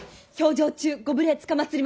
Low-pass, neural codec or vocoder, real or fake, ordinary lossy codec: none; none; real; none